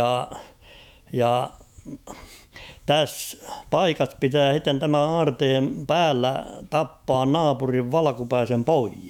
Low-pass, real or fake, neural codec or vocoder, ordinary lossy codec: 19.8 kHz; fake; autoencoder, 48 kHz, 128 numbers a frame, DAC-VAE, trained on Japanese speech; none